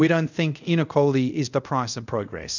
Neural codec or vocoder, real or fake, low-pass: codec, 24 kHz, 0.5 kbps, DualCodec; fake; 7.2 kHz